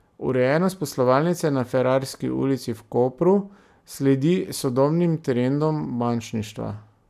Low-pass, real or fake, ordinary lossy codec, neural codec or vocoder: 14.4 kHz; real; none; none